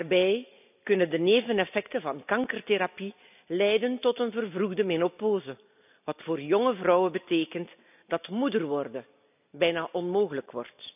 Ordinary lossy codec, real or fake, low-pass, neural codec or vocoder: none; real; 3.6 kHz; none